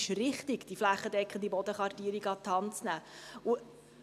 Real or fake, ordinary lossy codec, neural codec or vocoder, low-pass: real; none; none; 14.4 kHz